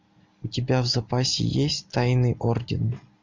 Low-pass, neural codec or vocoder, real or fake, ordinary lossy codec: 7.2 kHz; none; real; MP3, 64 kbps